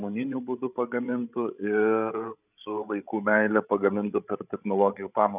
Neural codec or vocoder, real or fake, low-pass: codec, 16 kHz, 16 kbps, FreqCodec, larger model; fake; 3.6 kHz